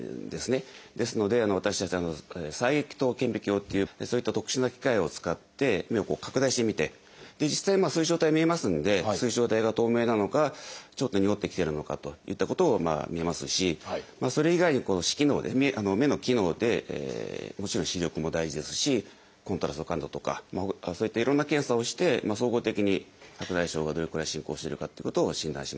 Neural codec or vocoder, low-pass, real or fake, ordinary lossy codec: none; none; real; none